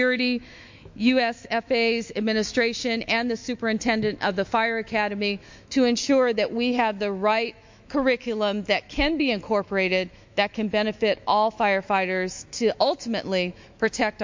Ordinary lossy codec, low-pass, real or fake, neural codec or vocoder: MP3, 48 kbps; 7.2 kHz; fake; autoencoder, 48 kHz, 128 numbers a frame, DAC-VAE, trained on Japanese speech